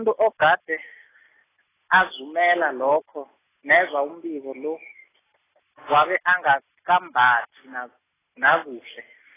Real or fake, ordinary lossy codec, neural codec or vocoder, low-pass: real; AAC, 16 kbps; none; 3.6 kHz